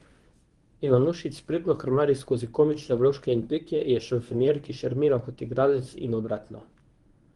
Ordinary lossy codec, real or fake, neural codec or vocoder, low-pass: Opus, 24 kbps; fake; codec, 24 kHz, 0.9 kbps, WavTokenizer, medium speech release version 1; 10.8 kHz